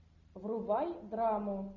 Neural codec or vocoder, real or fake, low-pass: none; real; 7.2 kHz